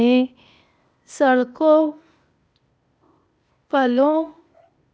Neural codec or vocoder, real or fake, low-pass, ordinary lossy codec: codec, 16 kHz, 0.8 kbps, ZipCodec; fake; none; none